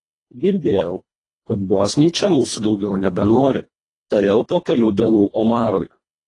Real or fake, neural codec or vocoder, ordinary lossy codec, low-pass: fake; codec, 24 kHz, 1.5 kbps, HILCodec; AAC, 32 kbps; 10.8 kHz